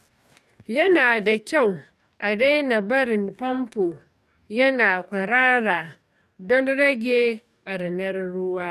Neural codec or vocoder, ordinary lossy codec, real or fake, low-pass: codec, 44.1 kHz, 2.6 kbps, DAC; none; fake; 14.4 kHz